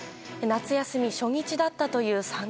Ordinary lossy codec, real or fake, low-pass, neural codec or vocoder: none; real; none; none